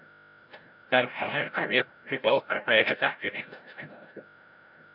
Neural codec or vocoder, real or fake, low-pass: codec, 16 kHz, 0.5 kbps, FreqCodec, larger model; fake; 5.4 kHz